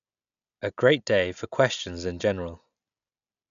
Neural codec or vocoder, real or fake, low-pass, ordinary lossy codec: none; real; 7.2 kHz; none